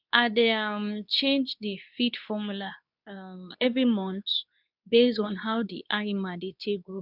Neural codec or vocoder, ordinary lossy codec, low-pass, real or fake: codec, 24 kHz, 0.9 kbps, WavTokenizer, medium speech release version 2; none; 5.4 kHz; fake